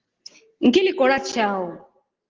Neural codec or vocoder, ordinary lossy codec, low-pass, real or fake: none; Opus, 16 kbps; 7.2 kHz; real